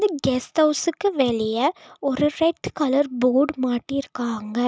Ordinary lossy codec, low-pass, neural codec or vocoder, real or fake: none; none; none; real